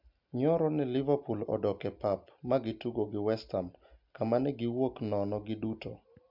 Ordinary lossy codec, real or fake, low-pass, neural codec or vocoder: MP3, 48 kbps; real; 5.4 kHz; none